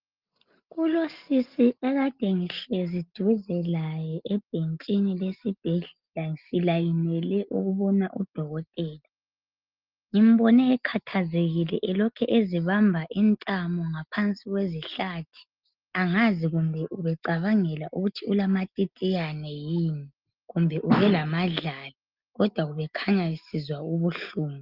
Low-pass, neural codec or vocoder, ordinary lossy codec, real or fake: 5.4 kHz; none; Opus, 32 kbps; real